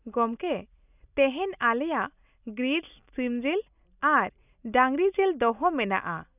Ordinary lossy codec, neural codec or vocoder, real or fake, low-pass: none; none; real; 3.6 kHz